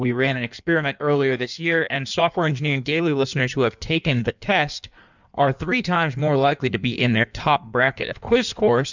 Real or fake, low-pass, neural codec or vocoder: fake; 7.2 kHz; codec, 16 kHz in and 24 kHz out, 1.1 kbps, FireRedTTS-2 codec